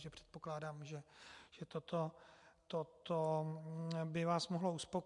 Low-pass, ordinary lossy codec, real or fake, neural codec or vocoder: 10.8 kHz; MP3, 64 kbps; real; none